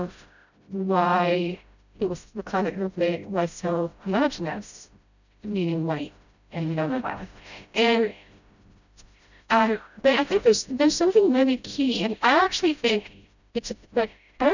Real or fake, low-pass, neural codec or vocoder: fake; 7.2 kHz; codec, 16 kHz, 0.5 kbps, FreqCodec, smaller model